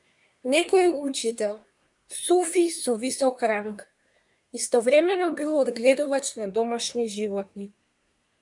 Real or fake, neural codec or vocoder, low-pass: fake; codec, 24 kHz, 1 kbps, SNAC; 10.8 kHz